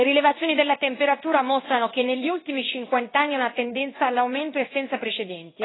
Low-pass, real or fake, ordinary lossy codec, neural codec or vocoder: 7.2 kHz; fake; AAC, 16 kbps; codec, 16 kHz in and 24 kHz out, 1 kbps, XY-Tokenizer